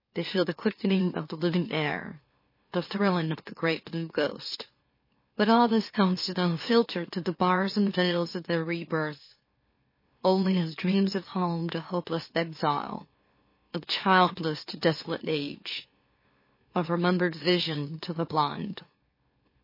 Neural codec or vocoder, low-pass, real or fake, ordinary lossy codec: autoencoder, 44.1 kHz, a latent of 192 numbers a frame, MeloTTS; 5.4 kHz; fake; MP3, 24 kbps